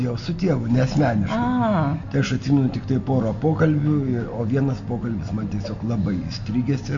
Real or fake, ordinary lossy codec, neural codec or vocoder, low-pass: real; MP3, 48 kbps; none; 7.2 kHz